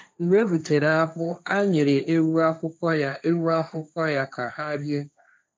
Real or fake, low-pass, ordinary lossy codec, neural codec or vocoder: fake; none; none; codec, 16 kHz, 1.1 kbps, Voila-Tokenizer